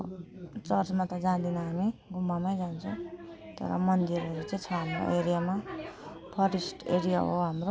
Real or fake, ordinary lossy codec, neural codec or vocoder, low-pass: real; none; none; none